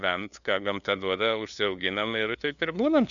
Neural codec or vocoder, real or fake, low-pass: codec, 16 kHz, 2 kbps, FunCodec, trained on LibriTTS, 25 frames a second; fake; 7.2 kHz